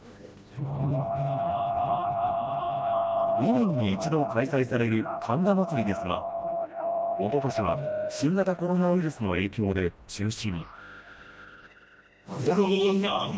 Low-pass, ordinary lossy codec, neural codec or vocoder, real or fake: none; none; codec, 16 kHz, 1 kbps, FreqCodec, smaller model; fake